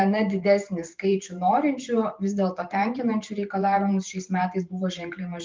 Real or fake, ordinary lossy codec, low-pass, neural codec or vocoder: fake; Opus, 24 kbps; 7.2 kHz; vocoder, 44.1 kHz, 128 mel bands every 512 samples, BigVGAN v2